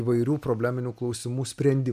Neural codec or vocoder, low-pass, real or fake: none; 14.4 kHz; real